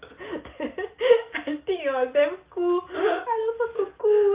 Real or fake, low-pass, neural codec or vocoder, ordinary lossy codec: real; 3.6 kHz; none; Opus, 32 kbps